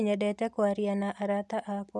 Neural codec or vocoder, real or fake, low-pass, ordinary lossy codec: none; real; none; none